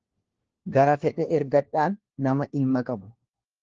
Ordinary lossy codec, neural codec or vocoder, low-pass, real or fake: Opus, 16 kbps; codec, 16 kHz, 1 kbps, FunCodec, trained on LibriTTS, 50 frames a second; 7.2 kHz; fake